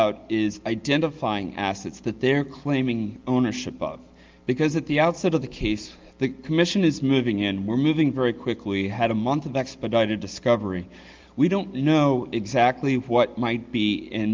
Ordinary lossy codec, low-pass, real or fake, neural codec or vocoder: Opus, 32 kbps; 7.2 kHz; real; none